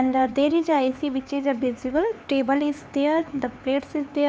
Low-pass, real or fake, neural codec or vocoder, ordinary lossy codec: none; fake; codec, 16 kHz, 4 kbps, X-Codec, HuBERT features, trained on LibriSpeech; none